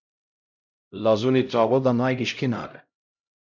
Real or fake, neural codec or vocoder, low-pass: fake; codec, 16 kHz, 0.5 kbps, X-Codec, HuBERT features, trained on LibriSpeech; 7.2 kHz